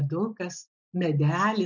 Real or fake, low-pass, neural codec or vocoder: real; 7.2 kHz; none